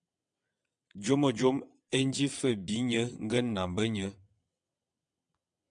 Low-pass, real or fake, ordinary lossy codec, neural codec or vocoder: 9.9 kHz; fake; MP3, 96 kbps; vocoder, 22.05 kHz, 80 mel bands, WaveNeXt